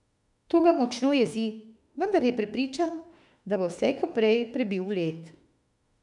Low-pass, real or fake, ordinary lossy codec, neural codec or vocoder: 10.8 kHz; fake; none; autoencoder, 48 kHz, 32 numbers a frame, DAC-VAE, trained on Japanese speech